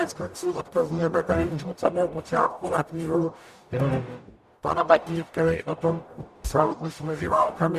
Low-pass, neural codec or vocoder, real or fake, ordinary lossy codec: 14.4 kHz; codec, 44.1 kHz, 0.9 kbps, DAC; fake; Opus, 64 kbps